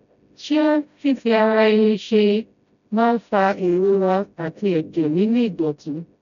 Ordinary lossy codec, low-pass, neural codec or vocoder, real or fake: none; 7.2 kHz; codec, 16 kHz, 0.5 kbps, FreqCodec, smaller model; fake